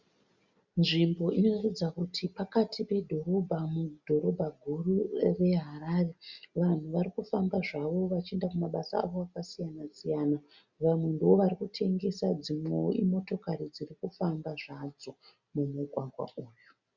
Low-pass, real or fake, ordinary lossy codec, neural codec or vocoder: 7.2 kHz; real; Opus, 64 kbps; none